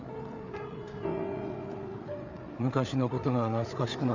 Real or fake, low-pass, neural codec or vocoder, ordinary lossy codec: fake; 7.2 kHz; codec, 16 kHz, 8 kbps, FreqCodec, larger model; none